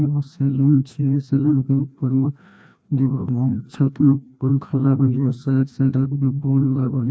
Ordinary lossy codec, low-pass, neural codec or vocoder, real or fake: none; none; codec, 16 kHz, 1 kbps, FreqCodec, larger model; fake